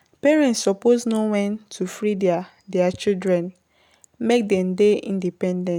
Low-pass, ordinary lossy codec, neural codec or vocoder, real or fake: 19.8 kHz; none; none; real